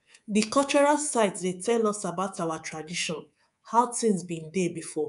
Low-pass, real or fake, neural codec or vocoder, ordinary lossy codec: 10.8 kHz; fake; codec, 24 kHz, 3.1 kbps, DualCodec; none